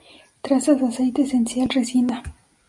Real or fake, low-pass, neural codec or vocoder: real; 10.8 kHz; none